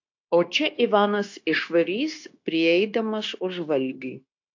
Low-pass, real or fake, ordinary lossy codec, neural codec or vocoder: 7.2 kHz; fake; AAC, 48 kbps; codec, 16 kHz, 0.9 kbps, LongCat-Audio-Codec